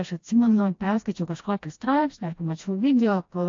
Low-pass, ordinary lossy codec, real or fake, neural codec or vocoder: 7.2 kHz; AAC, 48 kbps; fake; codec, 16 kHz, 1 kbps, FreqCodec, smaller model